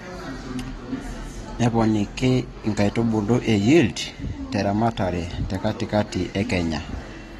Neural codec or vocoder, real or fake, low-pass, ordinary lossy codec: none; real; 19.8 kHz; AAC, 32 kbps